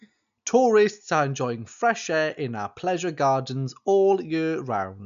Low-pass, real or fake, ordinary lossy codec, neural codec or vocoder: 7.2 kHz; real; none; none